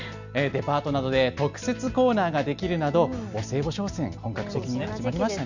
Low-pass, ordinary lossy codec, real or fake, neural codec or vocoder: 7.2 kHz; none; real; none